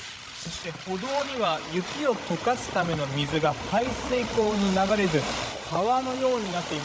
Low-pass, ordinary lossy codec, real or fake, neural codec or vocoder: none; none; fake; codec, 16 kHz, 16 kbps, FreqCodec, larger model